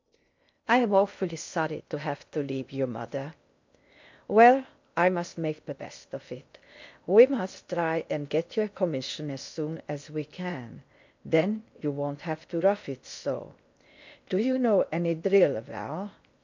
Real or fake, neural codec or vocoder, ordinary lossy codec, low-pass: fake; codec, 16 kHz in and 24 kHz out, 0.6 kbps, FocalCodec, streaming, 2048 codes; MP3, 64 kbps; 7.2 kHz